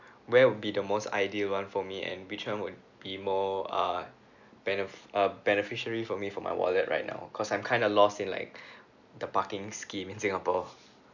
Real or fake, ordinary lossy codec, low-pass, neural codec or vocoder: real; none; 7.2 kHz; none